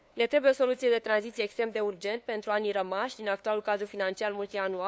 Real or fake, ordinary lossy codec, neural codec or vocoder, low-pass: fake; none; codec, 16 kHz, 2 kbps, FunCodec, trained on LibriTTS, 25 frames a second; none